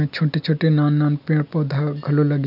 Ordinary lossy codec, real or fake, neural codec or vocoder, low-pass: none; real; none; 5.4 kHz